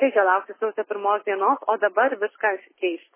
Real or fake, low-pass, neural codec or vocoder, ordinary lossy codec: real; 3.6 kHz; none; MP3, 16 kbps